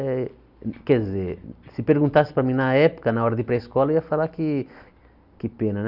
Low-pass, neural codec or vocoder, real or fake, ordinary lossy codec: 5.4 kHz; none; real; Opus, 64 kbps